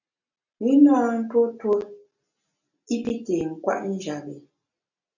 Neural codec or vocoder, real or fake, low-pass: none; real; 7.2 kHz